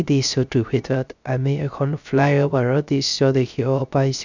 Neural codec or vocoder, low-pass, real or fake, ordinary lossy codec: codec, 16 kHz, 0.3 kbps, FocalCodec; 7.2 kHz; fake; none